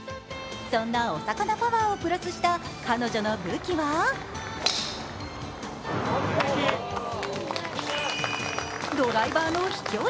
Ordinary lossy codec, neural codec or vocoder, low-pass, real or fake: none; none; none; real